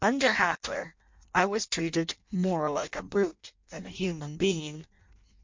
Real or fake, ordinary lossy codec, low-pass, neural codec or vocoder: fake; MP3, 48 kbps; 7.2 kHz; codec, 16 kHz in and 24 kHz out, 0.6 kbps, FireRedTTS-2 codec